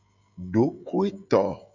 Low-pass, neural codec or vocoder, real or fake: 7.2 kHz; codec, 16 kHz, 16 kbps, FreqCodec, smaller model; fake